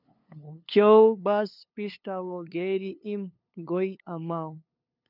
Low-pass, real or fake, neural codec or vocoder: 5.4 kHz; fake; codec, 16 kHz, 2 kbps, FunCodec, trained on LibriTTS, 25 frames a second